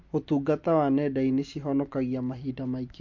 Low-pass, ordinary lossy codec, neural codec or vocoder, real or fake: 7.2 kHz; MP3, 48 kbps; none; real